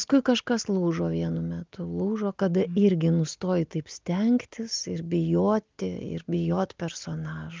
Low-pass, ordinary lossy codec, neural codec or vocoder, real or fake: 7.2 kHz; Opus, 32 kbps; none; real